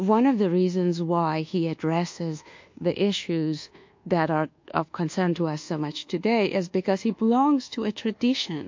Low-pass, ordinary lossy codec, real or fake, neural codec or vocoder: 7.2 kHz; MP3, 48 kbps; fake; codec, 24 kHz, 1.2 kbps, DualCodec